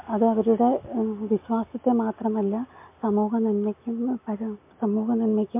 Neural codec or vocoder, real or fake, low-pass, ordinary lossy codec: none; real; 3.6 kHz; MP3, 24 kbps